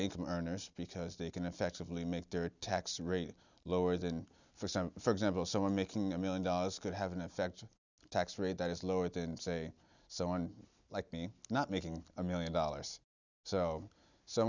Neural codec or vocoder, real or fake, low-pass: none; real; 7.2 kHz